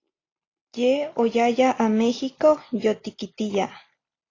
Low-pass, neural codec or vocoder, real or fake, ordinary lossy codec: 7.2 kHz; none; real; AAC, 32 kbps